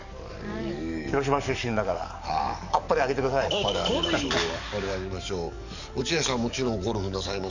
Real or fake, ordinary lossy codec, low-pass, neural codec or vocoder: fake; none; 7.2 kHz; codec, 44.1 kHz, 7.8 kbps, DAC